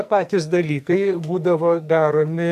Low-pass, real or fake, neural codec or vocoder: 14.4 kHz; fake; codec, 44.1 kHz, 2.6 kbps, SNAC